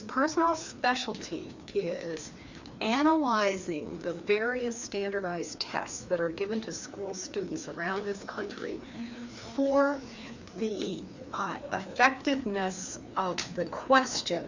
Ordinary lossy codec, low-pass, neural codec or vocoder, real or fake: Opus, 64 kbps; 7.2 kHz; codec, 16 kHz, 2 kbps, FreqCodec, larger model; fake